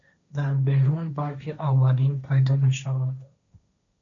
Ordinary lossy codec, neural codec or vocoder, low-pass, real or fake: AAC, 64 kbps; codec, 16 kHz, 1.1 kbps, Voila-Tokenizer; 7.2 kHz; fake